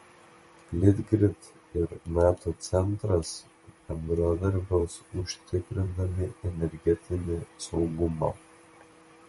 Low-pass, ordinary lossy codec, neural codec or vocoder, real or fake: 19.8 kHz; MP3, 48 kbps; vocoder, 44.1 kHz, 128 mel bands every 512 samples, BigVGAN v2; fake